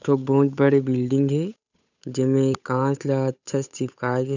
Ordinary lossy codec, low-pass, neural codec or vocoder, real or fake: none; 7.2 kHz; codec, 16 kHz, 8 kbps, FunCodec, trained on Chinese and English, 25 frames a second; fake